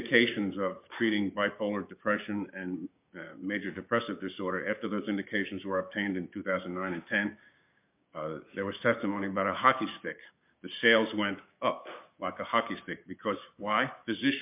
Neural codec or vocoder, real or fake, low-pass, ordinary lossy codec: codec, 16 kHz, 6 kbps, DAC; fake; 3.6 kHz; MP3, 32 kbps